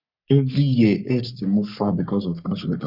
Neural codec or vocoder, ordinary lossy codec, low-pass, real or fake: codec, 44.1 kHz, 3.4 kbps, Pupu-Codec; none; 5.4 kHz; fake